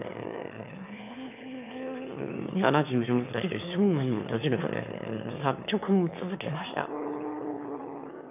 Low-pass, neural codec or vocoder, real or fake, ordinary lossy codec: 3.6 kHz; autoencoder, 22.05 kHz, a latent of 192 numbers a frame, VITS, trained on one speaker; fake; none